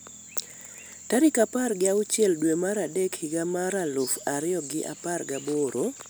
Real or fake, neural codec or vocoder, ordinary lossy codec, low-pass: real; none; none; none